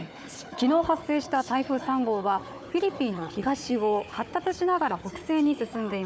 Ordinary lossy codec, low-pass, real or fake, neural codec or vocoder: none; none; fake; codec, 16 kHz, 4 kbps, FunCodec, trained on Chinese and English, 50 frames a second